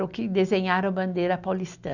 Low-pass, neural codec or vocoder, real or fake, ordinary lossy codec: 7.2 kHz; none; real; none